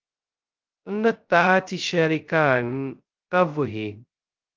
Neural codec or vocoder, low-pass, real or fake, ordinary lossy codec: codec, 16 kHz, 0.2 kbps, FocalCodec; 7.2 kHz; fake; Opus, 32 kbps